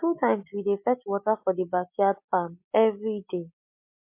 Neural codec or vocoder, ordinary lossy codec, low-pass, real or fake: none; MP3, 32 kbps; 3.6 kHz; real